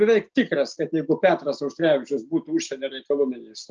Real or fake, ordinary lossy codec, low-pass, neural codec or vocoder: real; Opus, 24 kbps; 7.2 kHz; none